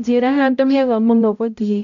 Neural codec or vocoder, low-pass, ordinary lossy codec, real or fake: codec, 16 kHz, 0.5 kbps, X-Codec, HuBERT features, trained on balanced general audio; 7.2 kHz; none; fake